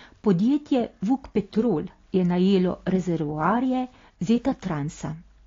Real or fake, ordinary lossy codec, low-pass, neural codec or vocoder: real; AAC, 32 kbps; 7.2 kHz; none